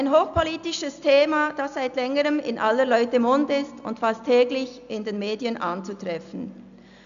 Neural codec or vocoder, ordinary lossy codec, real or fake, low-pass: none; none; real; 7.2 kHz